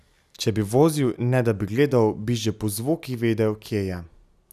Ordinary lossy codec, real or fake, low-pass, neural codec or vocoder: none; real; 14.4 kHz; none